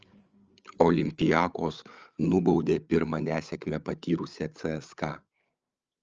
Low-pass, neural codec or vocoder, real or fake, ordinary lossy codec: 7.2 kHz; codec, 16 kHz, 8 kbps, FreqCodec, larger model; fake; Opus, 24 kbps